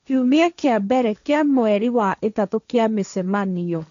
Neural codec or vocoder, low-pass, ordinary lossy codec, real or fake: codec, 16 kHz, 1.1 kbps, Voila-Tokenizer; 7.2 kHz; none; fake